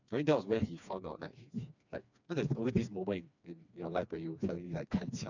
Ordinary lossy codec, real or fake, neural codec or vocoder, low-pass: none; fake; codec, 16 kHz, 2 kbps, FreqCodec, smaller model; 7.2 kHz